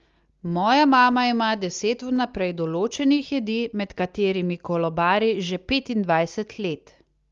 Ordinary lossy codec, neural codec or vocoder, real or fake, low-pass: Opus, 32 kbps; none; real; 7.2 kHz